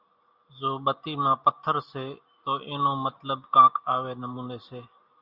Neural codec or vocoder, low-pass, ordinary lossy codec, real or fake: none; 5.4 kHz; Opus, 64 kbps; real